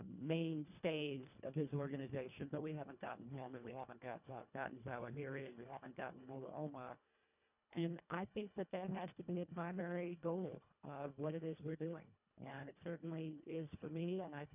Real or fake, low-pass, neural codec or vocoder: fake; 3.6 kHz; codec, 24 kHz, 1.5 kbps, HILCodec